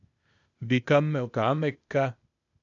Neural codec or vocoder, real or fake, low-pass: codec, 16 kHz, 0.8 kbps, ZipCodec; fake; 7.2 kHz